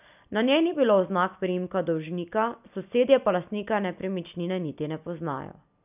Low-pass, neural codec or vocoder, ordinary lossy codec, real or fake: 3.6 kHz; none; none; real